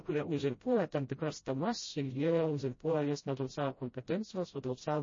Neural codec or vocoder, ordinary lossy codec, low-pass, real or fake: codec, 16 kHz, 0.5 kbps, FreqCodec, smaller model; MP3, 32 kbps; 7.2 kHz; fake